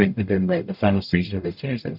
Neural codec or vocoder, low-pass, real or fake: codec, 44.1 kHz, 0.9 kbps, DAC; 5.4 kHz; fake